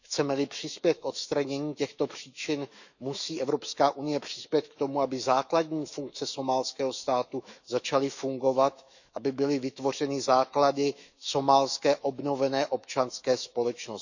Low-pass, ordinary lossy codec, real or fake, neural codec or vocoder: 7.2 kHz; none; fake; autoencoder, 48 kHz, 128 numbers a frame, DAC-VAE, trained on Japanese speech